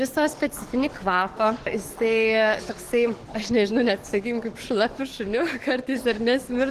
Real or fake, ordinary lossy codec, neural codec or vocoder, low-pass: fake; Opus, 16 kbps; codec, 44.1 kHz, 7.8 kbps, DAC; 14.4 kHz